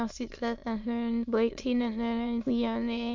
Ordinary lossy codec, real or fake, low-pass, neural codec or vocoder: MP3, 48 kbps; fake; 7.2 kHz; autoencoder, 22.05 kHz, a latent of 192 numbers a frame, VITS, trained on many speakers